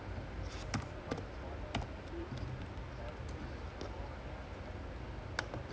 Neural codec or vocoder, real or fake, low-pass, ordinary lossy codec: none; real; none; none